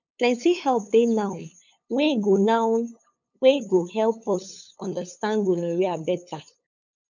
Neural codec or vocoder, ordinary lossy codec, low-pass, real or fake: codec, 16 kHz, 8 kbps, FunCodec, trained on LibriTTS, 25 frames a second; none; 7.2 kHz; fake